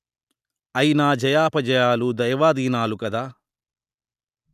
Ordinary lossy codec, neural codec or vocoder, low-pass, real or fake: none; none; 14.4 kHz; real